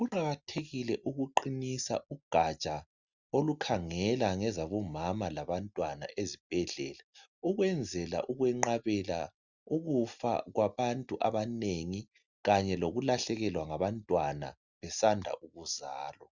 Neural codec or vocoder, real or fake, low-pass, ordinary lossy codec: none; real; 7.2 kHz; Opus, 64 kbps